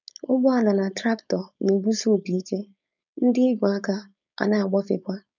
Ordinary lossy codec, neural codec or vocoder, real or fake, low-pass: none; codec, 16 kHz, 4.8 kbps, FACodec; fake; 7.2 kHz